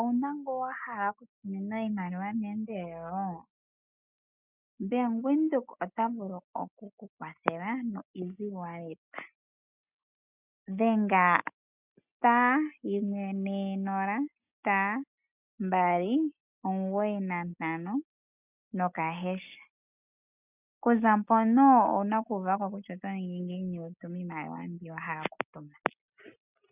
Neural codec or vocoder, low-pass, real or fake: none; 3.6 kHz; real